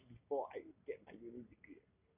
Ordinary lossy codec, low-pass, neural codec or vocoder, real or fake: none; 3.6 kHz; none; real